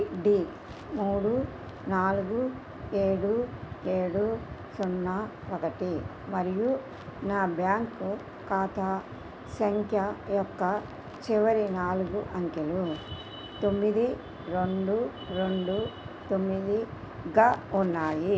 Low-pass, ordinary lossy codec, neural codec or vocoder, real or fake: none; none; none; real